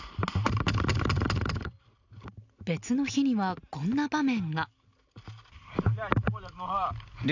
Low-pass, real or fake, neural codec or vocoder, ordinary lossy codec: 7.2 kHz; real; none; none